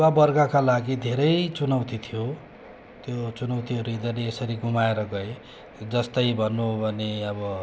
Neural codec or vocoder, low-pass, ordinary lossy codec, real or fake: none; none; none; real